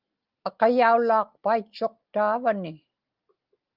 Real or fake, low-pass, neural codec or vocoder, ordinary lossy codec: real; 5.4 kHz; none; Opus, 32 kbps